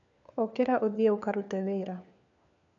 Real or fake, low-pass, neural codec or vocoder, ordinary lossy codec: fake; 7.2 kHz; codec, 16 kHz, 4 kbps, FunCodec, trained on LibriTTS, 50 frames a second; none